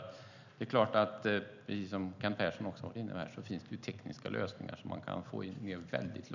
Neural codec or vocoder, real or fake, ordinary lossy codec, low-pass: none; real; none; 7.2 kHz